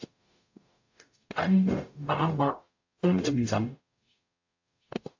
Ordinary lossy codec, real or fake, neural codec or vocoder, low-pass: AAC, 48 kbps; fake; codec, 44.1 kHz, 0.9 kbps, DAC; 7.2 kHz